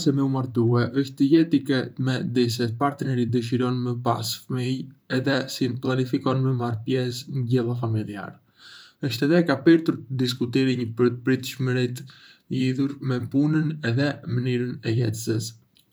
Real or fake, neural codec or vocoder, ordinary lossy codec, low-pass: fake; vocoder, 44.1 kHz, 128 mel bands, Pupu-Vocoder; none; none